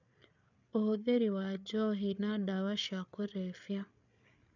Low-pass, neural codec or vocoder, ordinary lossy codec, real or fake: 7.2 kHz; codec, 16 kHz, 8 kbps, FreqCodec, larger model; none; fake